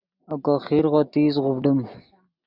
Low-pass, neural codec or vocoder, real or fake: 5.4 kHz; none; real